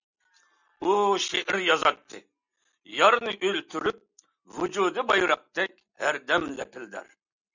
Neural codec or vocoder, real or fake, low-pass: none; real; 7.2 kHz